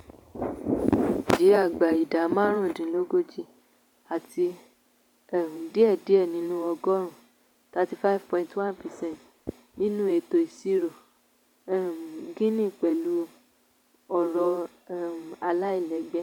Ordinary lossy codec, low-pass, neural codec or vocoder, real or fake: none; 19.8 kHz; vocoder, 44.1 kHz, 128 mel bands every 512 samples, BigVGAN v2; fake